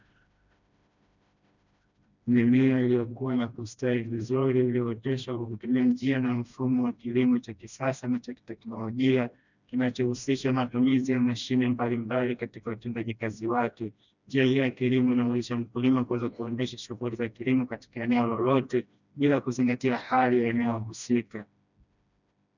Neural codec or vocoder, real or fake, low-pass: codec, 16 kHz, 1 kbps, FreqCodec, smaller model; fake; 7.2 kHz